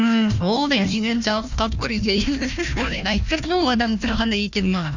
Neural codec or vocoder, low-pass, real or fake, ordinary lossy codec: codec, 16 kHz, 1 kbps, FunCodec, trained on Chinese and English, 50 frames a second; 7.2 kHz; fake; none